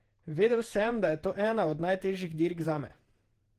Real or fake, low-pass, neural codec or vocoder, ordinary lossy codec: fake; 14.4 kHz; vocoder, 48 kHz, 128 mel bands, Vocos; Opus, 16 kbps